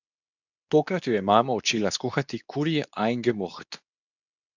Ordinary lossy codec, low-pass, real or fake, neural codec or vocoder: none; 7.2 kHz; fake; codec, 24 kHz, 0.9 kbps, WavTokenizer, medium speech release version 2